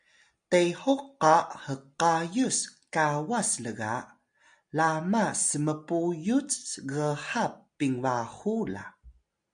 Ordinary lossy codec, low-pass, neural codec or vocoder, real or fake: MP3, 96 kbps; 9.9 kHz; none; real